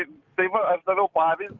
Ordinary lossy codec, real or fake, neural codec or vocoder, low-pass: Opus, 24 kbps; real; none; 7.2 kHz